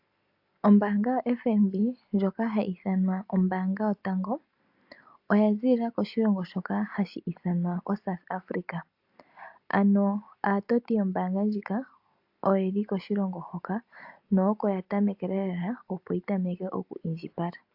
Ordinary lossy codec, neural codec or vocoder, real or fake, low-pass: AAC, 48 kbps; none; real; 5.4 kHz